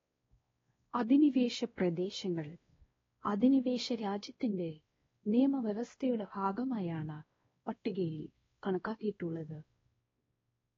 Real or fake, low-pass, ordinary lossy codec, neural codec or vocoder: fake; 7.2 kHz; AAC, 24 kbps; codec, 16 kHz, 1 kbps, X-Codec, WavLM features, trained on Multilingual LibriSpeech